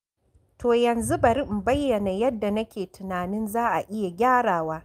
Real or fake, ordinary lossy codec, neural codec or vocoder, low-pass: real; Opus, 32 kbps; none; 14.4 kHz